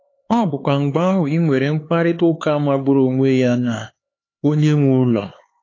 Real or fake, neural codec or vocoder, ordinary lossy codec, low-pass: fake; codec, 16 kHz, 4 kbps, X-Codec, HuBERT features, trained on LibriSpeech; AAC, 32 kbps; 7.2 kHz